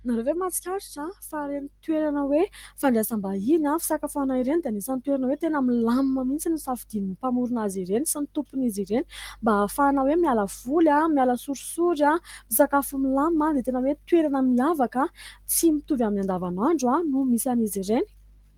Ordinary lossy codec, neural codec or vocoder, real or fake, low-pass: Opus, 24 kbps; none; real; 19.8 kHz